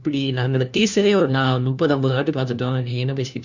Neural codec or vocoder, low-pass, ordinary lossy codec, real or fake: codec, 16 kHz, 1 kbps, FunCodec, trained on LibriTTS, 50 frames a second; 7.2 kHz; none; fake